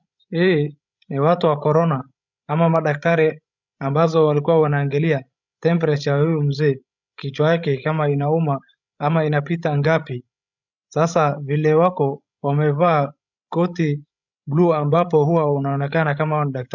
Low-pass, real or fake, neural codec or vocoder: 7.2 kHz; fake; codec, 16 kHz, 16 kbps, FreqCodec, larger model